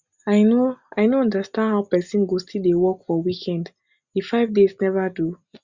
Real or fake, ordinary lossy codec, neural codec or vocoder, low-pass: real; Opus, 64 kbps; none; 7.2 kHz